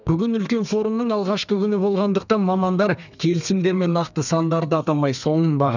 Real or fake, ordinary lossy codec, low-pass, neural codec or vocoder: fake; none; 7.2 kHz; codec, 32 kHz, 1.9 kbps, SNAC